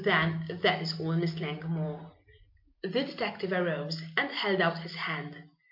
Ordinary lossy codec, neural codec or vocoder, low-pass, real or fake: MP3, 48 kbps; none; 5.4 kHz; real